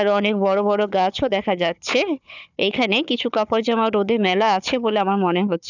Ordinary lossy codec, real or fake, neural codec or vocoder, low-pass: none; fake; codec, 16 kHz, 4 kbps, FunCodec, trained on Chinese and English, 50 frames a second; 7.2 kHz